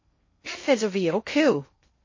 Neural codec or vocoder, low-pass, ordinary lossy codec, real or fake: codec, 16 kHz in and 24 kHz out, 0.6 kbps, FocalCodec, streaming, 2048 codes; 7.2 kHz; MP3, 32 kbps; fake